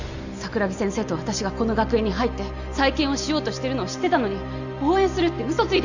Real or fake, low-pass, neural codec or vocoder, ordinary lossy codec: real; 7.2 kHz; none; none